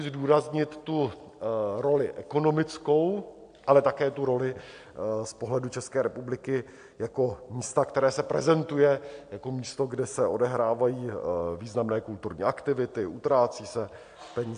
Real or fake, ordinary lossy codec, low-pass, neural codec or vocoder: real; AAC, 64 kbps; 9.9 kHz; none